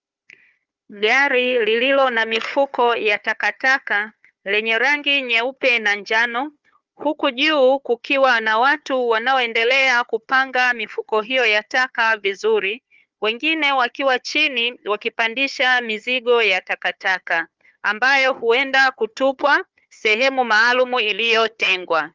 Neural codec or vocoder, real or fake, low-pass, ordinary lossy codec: codec, 16 kHz, 4 kbps, FunCodec, trained on Chinese and English, 50 frames a second; fake; 7.2 kHz; Opus, 32 kbps